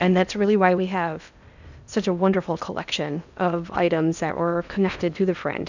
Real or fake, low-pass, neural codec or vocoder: fake; 7.2 kHz; codec, 16 kHz in and 24 kHz out, 0.8 kbps, FocalCodec, streaming, 65536 codes